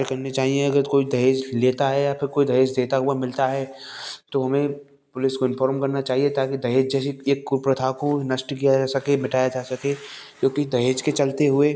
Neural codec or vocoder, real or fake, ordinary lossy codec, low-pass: none; real; none; none